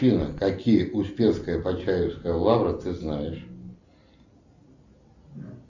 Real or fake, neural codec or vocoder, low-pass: real; none; 7.2 kHz